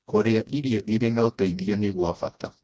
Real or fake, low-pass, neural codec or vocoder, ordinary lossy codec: fake; none; codec, 16 kHz, 1 kbps, FreqCodec, smaller model; none